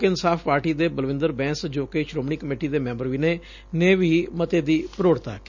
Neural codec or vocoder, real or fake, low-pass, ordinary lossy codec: none; real; 7.2 kHz; none